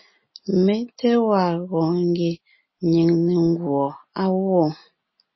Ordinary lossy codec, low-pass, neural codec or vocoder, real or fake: MP3, 24 kbps; 7.2 kHz; none; real